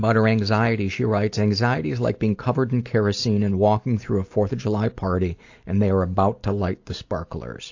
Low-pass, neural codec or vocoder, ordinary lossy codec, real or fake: 7.2 kHz; none; AAC, 48 kbps; real